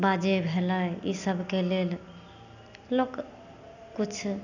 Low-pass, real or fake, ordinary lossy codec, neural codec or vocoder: 7.2 kHz; real; none; none